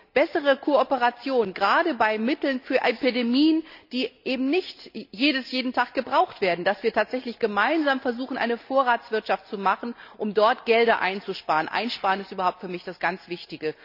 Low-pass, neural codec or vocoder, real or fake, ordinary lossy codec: 5.4 kHz; none; real; none